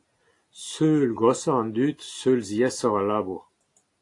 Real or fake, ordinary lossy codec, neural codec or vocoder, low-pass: real; AAC, 48 kbps; none; 10.8 kHz